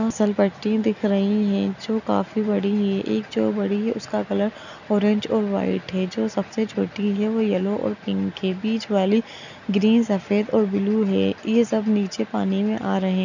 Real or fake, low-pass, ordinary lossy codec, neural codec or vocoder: real; 7.2 kHz; none; none